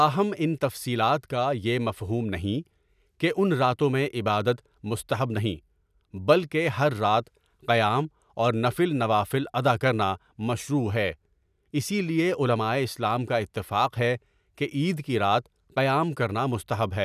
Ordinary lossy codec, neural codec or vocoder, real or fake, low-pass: none; none; real; 14.4 kHz